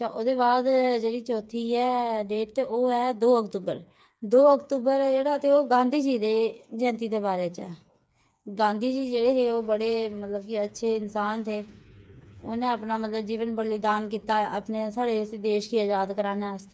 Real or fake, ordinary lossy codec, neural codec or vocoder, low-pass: fake; none; codec, 16 kHz, 4 kbps, FreqCodec, smaller model; none